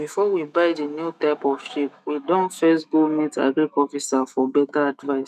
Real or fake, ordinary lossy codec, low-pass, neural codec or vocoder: fake; none; 14.4 kHz; autoencoder, 48 kHz, 128 numbers a frame, DAC-VAE, trained on Japanese speech